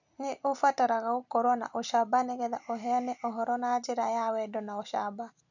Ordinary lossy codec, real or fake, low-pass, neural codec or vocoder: none; real; 7.2 kHz; none